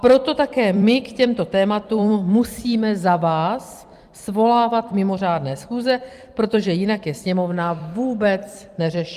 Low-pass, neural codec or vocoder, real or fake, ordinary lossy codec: 14.4 kHz; vocoder, 44.1 kHz, 128 mel bands every 256 samples, BigVGAN v2; fake; Opus, 32 kbps